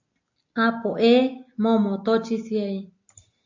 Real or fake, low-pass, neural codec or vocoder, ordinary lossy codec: real; 7.2 kHz; none; AAC, 48 kbps